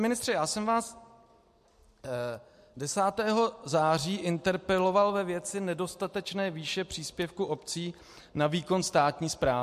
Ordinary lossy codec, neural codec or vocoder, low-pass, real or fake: MP3, 64 kbps; none; 14.4 kHz; real